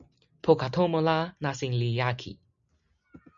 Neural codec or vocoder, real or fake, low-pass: none; real; 7.2 kHz